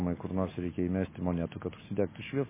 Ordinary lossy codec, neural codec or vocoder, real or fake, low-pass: MP3, 16 kbps; none; real; 3.6 kHz